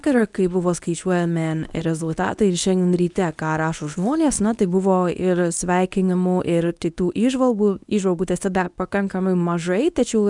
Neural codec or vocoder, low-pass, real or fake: codec, 24 kHz, 0.9 kbps, WavTokenizer, medium speech release version 1; 10.8 kHz; fake